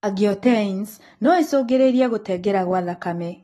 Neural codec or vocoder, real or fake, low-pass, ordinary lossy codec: none; real; 19.8 kHz; AAC, 32 kbps